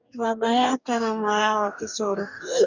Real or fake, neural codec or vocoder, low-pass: fake; codec, 44.1 kHz, 2.6 kbps, DAC; 7.2 kHz